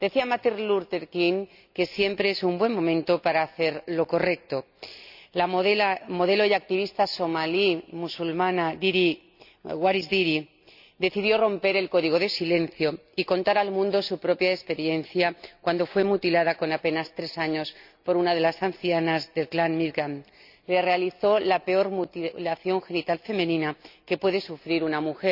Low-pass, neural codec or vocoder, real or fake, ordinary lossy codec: 5.4 kHz; none; real; none